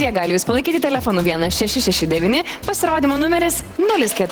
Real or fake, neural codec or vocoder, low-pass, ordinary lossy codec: fake; vocoder, 48 kHz, 128 mel bands, Vocos; 19.8 kHz; Opus, 16 kbps